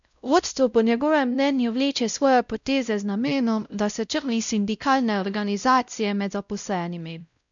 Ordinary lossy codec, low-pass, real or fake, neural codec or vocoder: none; 7.2 kHz; fake; codec, 16 kHz, 0.5 kbps, X-Codec, WavLM features, trained on Multilingual LibriSpeech